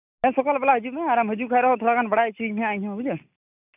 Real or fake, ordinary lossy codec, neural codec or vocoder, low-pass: real; none; none; 3.6 kHz